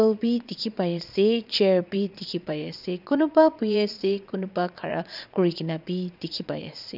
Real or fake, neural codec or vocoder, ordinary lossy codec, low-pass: fake; vocoder, 22.05 kHz, 80 mel bands, Vocos; none; 5.4 kHz